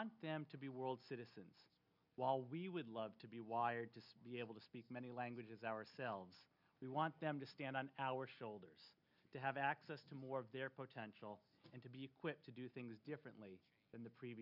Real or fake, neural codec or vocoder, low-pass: real; none; 5.4 kHz